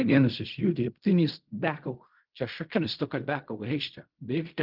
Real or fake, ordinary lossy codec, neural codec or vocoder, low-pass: fake; Opus, 24 kbps; codec, 16 kHz in and 24 kHz out, 0.4 kbps, LongCat-Audio-Codec, fine tuned four codebook decoder; 5.4 kHz